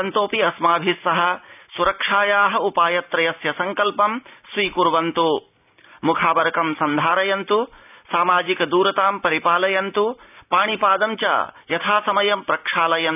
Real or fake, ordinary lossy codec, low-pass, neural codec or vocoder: real; none; 3.6 kHz; none